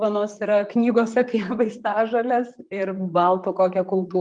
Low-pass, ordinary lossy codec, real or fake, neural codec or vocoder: 9.9 kHz; Opus, 32 kbps; fake; vocoder, 44.1 kHz, 128 mel bands, Pupu-Vocoder